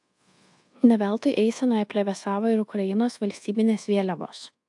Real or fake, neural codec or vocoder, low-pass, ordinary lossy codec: fake; codec, 24 kHz, 1.2 kbps, DualCodec; 10.8 kHz; AAC, 64 kbps